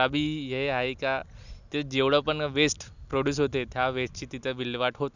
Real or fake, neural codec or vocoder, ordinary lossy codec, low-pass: real; none; none; 7.2 kHz